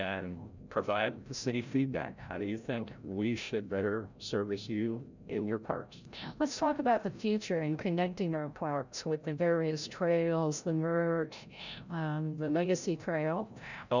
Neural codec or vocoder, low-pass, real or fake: codec, 16 kHz, 0.5 kbps, FreqCodec, larger model; 7.2 kHz; fake